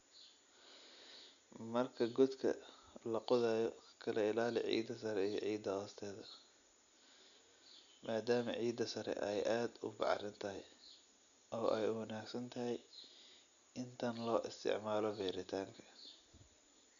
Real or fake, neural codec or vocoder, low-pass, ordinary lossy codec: real; none; 7.2 kHz; none